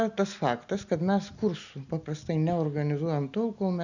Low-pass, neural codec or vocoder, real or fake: 7.2 kHz; none; real